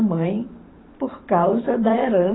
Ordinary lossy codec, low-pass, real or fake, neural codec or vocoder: AAC, 16 kbps; 7.2 kHz; fake; vocoder, 44.1 kHz, 128 mel bands every 512 samples, BigVGAN v2